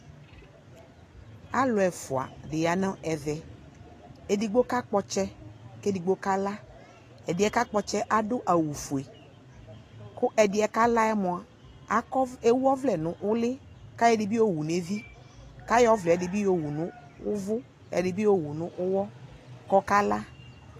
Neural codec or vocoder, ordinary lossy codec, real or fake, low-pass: none; AAC, 48 kbps; real; 14.4 kHz